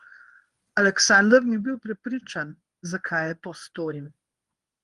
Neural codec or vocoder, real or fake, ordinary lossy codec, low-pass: codec, 24 kHz, 0.9 kbps, WavTokenizer, medium speech release version 1; fake; Opus, 24 kbps; 10.8 kHz